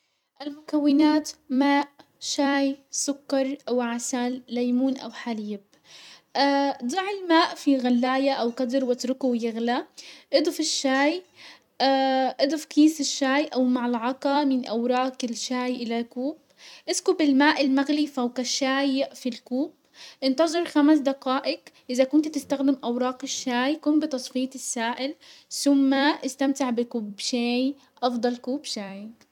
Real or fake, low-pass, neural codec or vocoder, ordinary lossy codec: fake; 19.8 kHz; vocoder, 44.1 kHz, 128 mel bands every 256 samples, BigVGAN v2; none